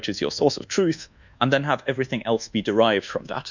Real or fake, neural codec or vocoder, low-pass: fake; codec, 24 kHz, 1.2 kbps, DualCodec; 7.2 kHz